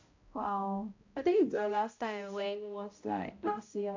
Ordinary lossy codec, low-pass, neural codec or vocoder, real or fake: AAC, 48 kbps; 7.2 kHz; codec, 16 kHz, 0.5 kbps, X-Codec, HuBERT features, trained on balanced general audio; fake